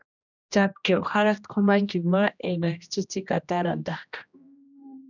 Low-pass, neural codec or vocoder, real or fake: 7.2 kHz; codec, 16 kHz, 1 kbps, X-Codec, HuBERT features, trained on general audio; fake